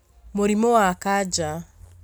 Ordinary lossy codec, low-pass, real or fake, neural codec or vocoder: none; none; real; none